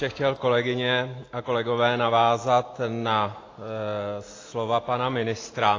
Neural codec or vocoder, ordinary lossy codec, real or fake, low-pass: none; AAC, 32 kbps; real; 7.2 kHz